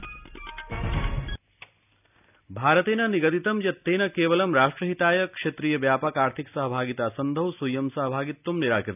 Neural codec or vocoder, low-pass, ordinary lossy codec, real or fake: none; 3.6 kHz; none; real